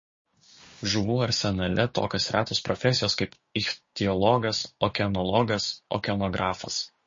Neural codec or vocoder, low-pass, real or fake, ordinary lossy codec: codec, 16 kHz, 6 kbps, DAC; 7.2 kHz; fake; MP3, 32 kbps